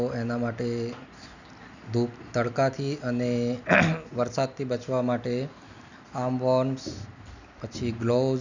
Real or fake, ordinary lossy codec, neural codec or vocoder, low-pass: real; none; none; 7.2 kHz